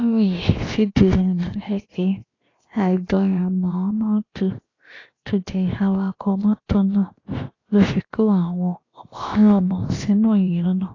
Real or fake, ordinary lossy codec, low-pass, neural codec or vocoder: fake; AAC, 32 kbps; 7.2 kHz; codec, 16 kHz, 0.7 kbps, FocalCodec